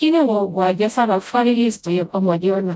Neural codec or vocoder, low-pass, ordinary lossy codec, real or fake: codec, 16 kHz, 0.5 kbps, FreqCodec, smaller model; none; none; fake